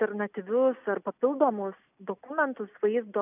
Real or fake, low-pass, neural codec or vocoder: real; 3.6 kHz; none